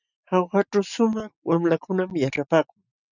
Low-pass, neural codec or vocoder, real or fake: 7.2 kHz; none; real